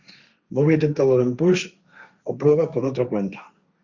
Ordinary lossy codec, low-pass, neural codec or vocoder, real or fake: Opus, 64 kbps; 7.2 kHz; codec, 16 kHz, 1.1 kbps, Voila-Tokenizer; fake